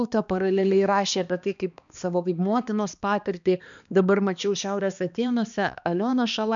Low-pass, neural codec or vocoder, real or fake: 7.2 kHz; codec, 16 kHz, 2 kbps, X-Codec, HuBERT features, trained on balanced general audio; fake